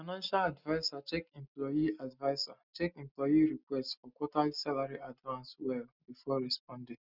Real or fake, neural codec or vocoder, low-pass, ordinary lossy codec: real; none; 5.4 kHz; none